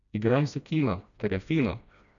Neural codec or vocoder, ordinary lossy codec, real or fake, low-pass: codec, 16 kHz, 1 kbps, FreqCodec, smaller model; none; fake; 7.2 kHz